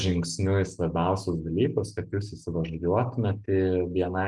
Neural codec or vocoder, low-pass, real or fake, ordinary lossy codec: none; 10.8 kHz; real; Opus, 32 kbps